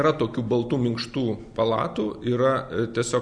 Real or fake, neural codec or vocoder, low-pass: real; none; 9.9 kHz